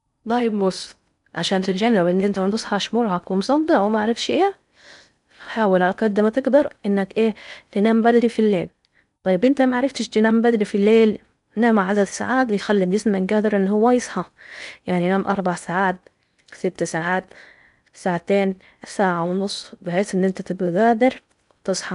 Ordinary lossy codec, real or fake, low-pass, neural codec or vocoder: none; fake; 10.8 kHz; codec, 16 kHz in and 24 kHz out, 0.6 kbps, FocalCodec, streaming, 4096 codes